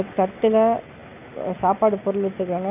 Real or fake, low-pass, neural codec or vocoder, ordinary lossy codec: real; 3.6 kHz; none; none